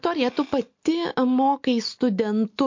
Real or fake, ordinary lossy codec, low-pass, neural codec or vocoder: real; MP3, 48 kbps; 7.2 kHz; none